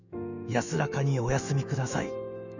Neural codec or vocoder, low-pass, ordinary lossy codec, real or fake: autoencoder, 48 kHz, 128 numbers a frame, DAC-VAE, trained on Japanese speech; 7.2 kHz; none; fake